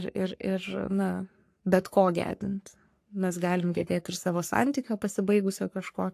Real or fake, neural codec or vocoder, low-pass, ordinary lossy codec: fake; codec, 44.1 kHz, 3.4 kbps, Pupu-Codec; 14.4 kHz; AAC, 64 kbps